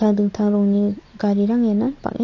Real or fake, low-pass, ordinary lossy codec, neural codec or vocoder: real; 7.2 kHz; AAC, 48 kbps; none